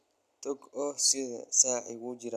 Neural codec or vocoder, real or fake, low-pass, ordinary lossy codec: none; real; 14.4 kHz; none